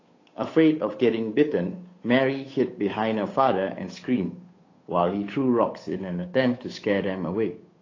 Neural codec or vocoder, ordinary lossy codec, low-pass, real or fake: codec, 16 kHz, 8 kbps, FunCodec, trained on Chinese and English, 25 frames a second; AAC, 32 kbps; 7.2 kHz; fake